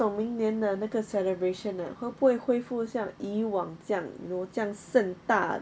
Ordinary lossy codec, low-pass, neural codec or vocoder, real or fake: none; none; none; real